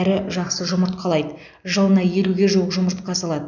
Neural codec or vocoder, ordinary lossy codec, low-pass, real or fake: none; none; 7.2 kHz; real